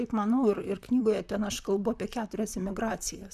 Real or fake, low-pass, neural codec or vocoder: fake; 14.4 kHz; vocoder, 44.1 kHz, 128 mel bands, Pupu-Vocoder